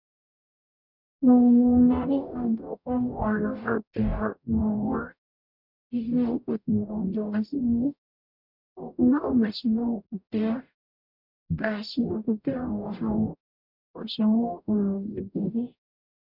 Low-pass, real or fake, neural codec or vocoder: 5.4 kHz; fake; codec, 44.1 kHz, 0.9 kbps, DAC